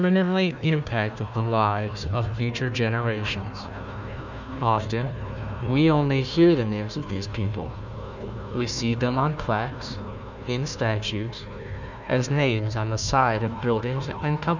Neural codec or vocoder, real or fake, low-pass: codec, 16 kHz, 1 kbps, FunCodec, trained on Chinese and English, 50 frames a second; fake; 7.2 kHz